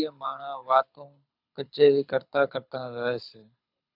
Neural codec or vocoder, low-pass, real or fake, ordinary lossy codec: codec, 24 kHz, 6 kbps, HILCodec; 5.4 kHz; fake; AAC, 48 kbps